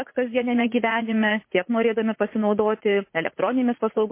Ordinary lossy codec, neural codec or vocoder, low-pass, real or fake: MP3, 24 kbps; vocoder, 44.1 kHz, 80 mel bands, Vocos; 3.6 kHz; fake